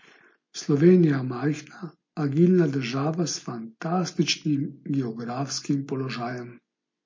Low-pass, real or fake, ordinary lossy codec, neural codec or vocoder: 7.2 kHz; real; MP3, 32 kbps; none